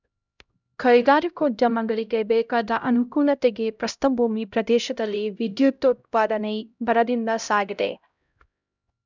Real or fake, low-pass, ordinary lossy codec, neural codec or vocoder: fake; 7.2 kHz; none; codec, 16 kHz, 0.5 kbps, X-Codec, HuBERT features, trained on LibriSpeech